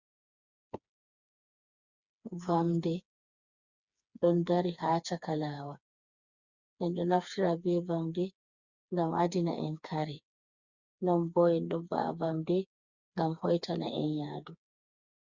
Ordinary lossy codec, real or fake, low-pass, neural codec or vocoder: Opus, 64 kbps; fake; 7.2 kHz; codec, 16 kHz, 4 kbps, FreqCodec, smaller model